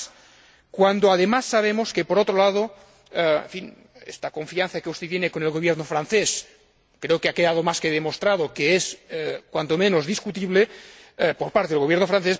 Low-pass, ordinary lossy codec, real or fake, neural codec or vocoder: none; none; real; none